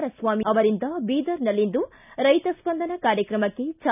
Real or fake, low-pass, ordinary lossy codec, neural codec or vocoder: real; 3.6 kHz; none; none